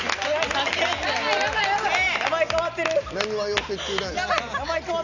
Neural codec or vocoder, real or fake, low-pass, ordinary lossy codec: none; real; 7.2 kHz; none